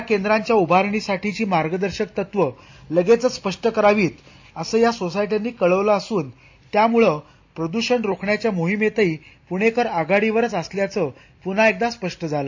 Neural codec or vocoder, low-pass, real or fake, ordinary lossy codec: none; 7.2 kHz; real; AAC, 48 kbps